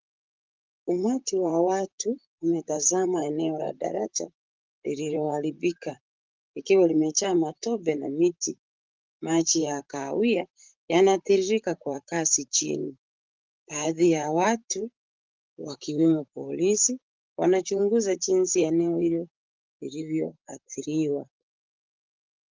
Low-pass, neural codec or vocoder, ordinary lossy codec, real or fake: 7.2 kHz; vocoder, 44.1 kHz, 128 mel bands, Pupu-Vocoder; Opus, 24 kbps; fake